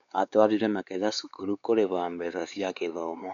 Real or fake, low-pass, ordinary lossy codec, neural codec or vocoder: fake; 7.2 kHz; none; codec, 16 kHz, 4 kbps, X-Codec, WavLM features, trained on Multilingual LibriSpeech